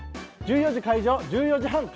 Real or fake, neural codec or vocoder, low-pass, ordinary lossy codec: real; none; none; none